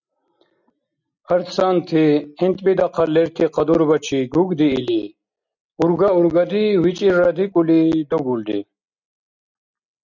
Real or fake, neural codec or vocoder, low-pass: real; none; 7.2 kHz